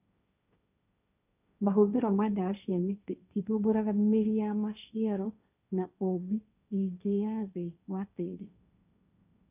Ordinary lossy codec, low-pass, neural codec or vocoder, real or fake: none; 3.6 kHz; codec, 16 kHz, 1.1 kbps, Voila-Tokenizer; fake